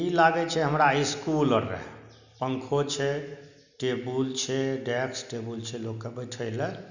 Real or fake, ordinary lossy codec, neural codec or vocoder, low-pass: real; none; none; 7.2 kHz